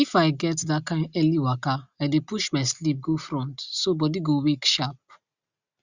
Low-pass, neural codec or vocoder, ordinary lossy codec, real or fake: 7.2 kHz; none; Opus, 64 kbps; real